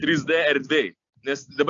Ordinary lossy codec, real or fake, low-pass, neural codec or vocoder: AAC, 64 kbps; real; 7.2 kHz; none